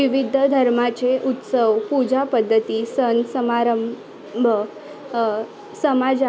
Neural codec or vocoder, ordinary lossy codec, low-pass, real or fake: none; none; none; real